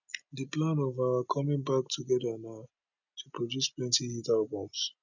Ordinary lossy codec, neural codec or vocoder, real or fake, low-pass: none; none; real; 7.2 kHz